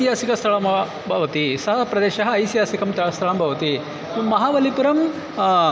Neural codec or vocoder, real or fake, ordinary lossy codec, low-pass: none; real; none; none